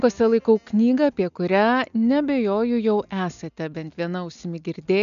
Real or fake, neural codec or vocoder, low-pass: real; none; 7.2 kHz